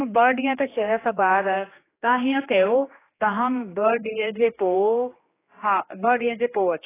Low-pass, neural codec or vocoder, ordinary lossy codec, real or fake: 3.6 kHz; codec, 16 kHz, 2 kbps, X-Codec, HuBERT features, trained on general audio; AAC, 16 kbps; fake